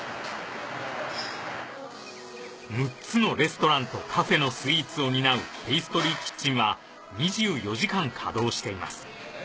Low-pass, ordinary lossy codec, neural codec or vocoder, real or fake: none; none; none; real